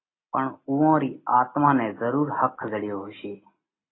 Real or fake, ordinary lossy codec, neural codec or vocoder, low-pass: real; AAC, 16 kbps; none; 7.2 kHz